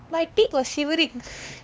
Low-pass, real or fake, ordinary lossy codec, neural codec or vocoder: none; fake; none; codec, 16 kHz, 2 kbps, X-Codec, HuBERT features, trained on LibriSpeech